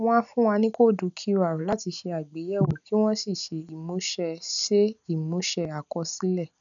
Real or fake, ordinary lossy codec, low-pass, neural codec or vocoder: real; none; 7.2 kHz; none